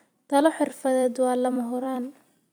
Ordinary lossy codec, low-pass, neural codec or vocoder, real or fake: none; none; vocoder, 44.1 kHz, 128 mel bands every 256 samples, BigVGAN v2; fake